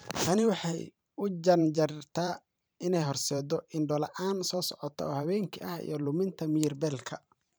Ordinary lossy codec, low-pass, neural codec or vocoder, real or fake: none; none; vocoder, 44.1 kHz, 128 mel bands every 512 samples, BigVGAN v2; fake